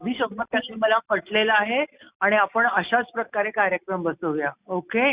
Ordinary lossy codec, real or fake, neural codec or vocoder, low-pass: Opus, 64 kbps; real; none; 3.6 kHz